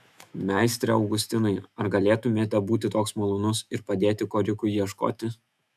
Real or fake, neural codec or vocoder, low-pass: fake; autoencoder, 48 kHz, 128 numbers a frame, DAC-VAE, trained on Japanese speech; 14.4 kHz